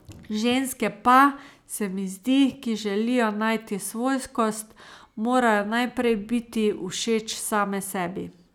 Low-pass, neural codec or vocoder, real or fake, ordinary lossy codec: 19.8 kHz; none; real; none